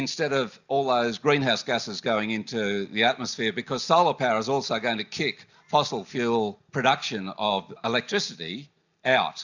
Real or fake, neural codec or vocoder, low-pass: real; none; 7.2 kHz